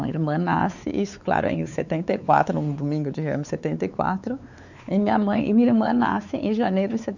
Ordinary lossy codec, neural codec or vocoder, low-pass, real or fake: none; codec, 16 kHz, 4 kbps, X-Codec, WavLM features, trained on Multilingual LibriSpeech; 7.2 kHz; fake